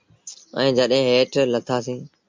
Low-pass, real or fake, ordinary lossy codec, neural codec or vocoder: 7.2 kHz; real; MP3, 64 kbps; none